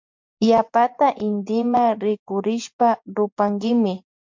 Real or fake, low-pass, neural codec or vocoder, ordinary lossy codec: fake; 7.2 kHz; vocoder, 24 kHz, 100 mel bands, Vocos; MP3, 64 kbps